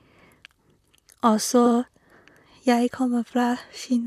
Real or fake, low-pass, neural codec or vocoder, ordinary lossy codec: fake; 14.4 kHz; vocoder, 44.1 kHz, 128 mel bands, Pupu-Vocoder; none